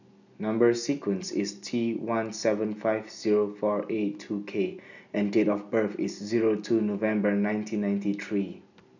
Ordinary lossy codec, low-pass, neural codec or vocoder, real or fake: none; 7.2 kHz; none; real